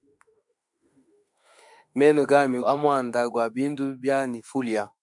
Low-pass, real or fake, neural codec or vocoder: 10.8 kHz; fake; autoencoder, 48 kHz, 32 numbers a frame, DAC-VAE, trained on Japanese speech